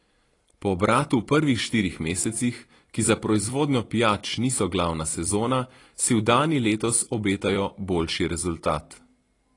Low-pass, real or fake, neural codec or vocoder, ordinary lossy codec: 10.8 kHz; fake; vocoder, 44.1 kHz, 128 mel bands every 256 samples, BigVGAN v2; AAC, 32 kbps